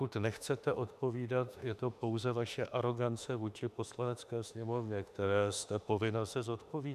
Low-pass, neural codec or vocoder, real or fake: 14.4 kHz; autoencoder, 48 kHz, 32 numbers a frame, DAC-VAE, trained on Japanese speech; fake